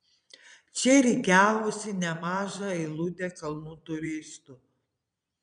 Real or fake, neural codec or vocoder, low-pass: fake; vocoder, 22.05 kHz, 80 mel bands, Vocos; 9.9 kHz